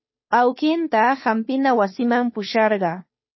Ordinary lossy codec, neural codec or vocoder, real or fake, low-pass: MP3, 24 kbps; codec, 16 kHz, 2 kbps, FunCodec, trained on Chinese and English, 25 frames a second; fake; 7.2 kHz